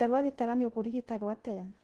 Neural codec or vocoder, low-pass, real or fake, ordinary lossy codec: codec, 16 kHz in and 24 kHz out, 0.6 kbps, FocalCodec, streaming, 2048 codes; 10.8 kHz; fake; Opus, 16 kbps